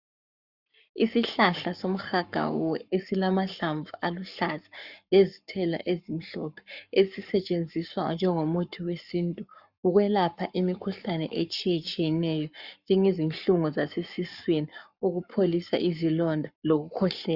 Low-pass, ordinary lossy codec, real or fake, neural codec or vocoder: 5.4 kHz; Opus, 64 kbps; fake; codec, 44.1 kHz, 7.8 kbps, Pupu-Codec